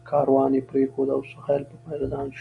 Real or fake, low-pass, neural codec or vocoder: real; 10.8 kHz; none